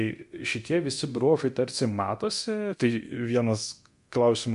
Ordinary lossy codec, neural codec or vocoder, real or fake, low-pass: MP3, 64 kbps; codec, 24 kHz, 0.9 kbps, DualCodec; fake; 10.8 kHz